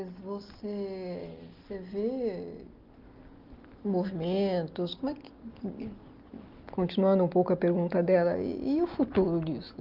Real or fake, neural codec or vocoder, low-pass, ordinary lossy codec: real; none; 5.4 kHz; Opus, 32 kbps